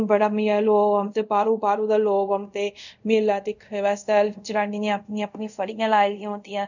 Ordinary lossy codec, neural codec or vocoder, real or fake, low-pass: none; codec, 24 kHz, 0.5 kbps, DualCodec; fake; 7.2 kHz